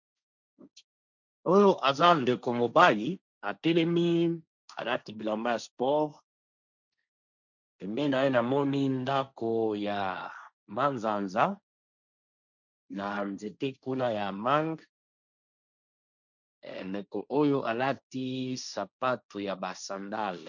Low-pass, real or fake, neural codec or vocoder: 7.2 kHz; fake; codec, 16 kHz, 1.1 kbps, Voila-Tokenizer